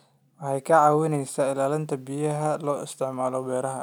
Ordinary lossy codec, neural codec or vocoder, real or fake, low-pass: none; none; real; none